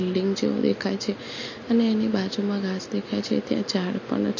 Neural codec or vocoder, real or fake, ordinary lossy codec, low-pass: none; real; MP3, 32 kbps; 7.2 kHz